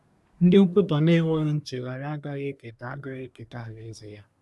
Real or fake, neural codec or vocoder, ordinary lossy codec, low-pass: fake; codec, 24 kHz, 1 kbps, SNAC; none; none